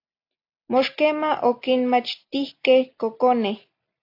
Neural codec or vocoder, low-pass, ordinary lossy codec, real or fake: none; 5.4 kHz; AAC, 32 kbps; real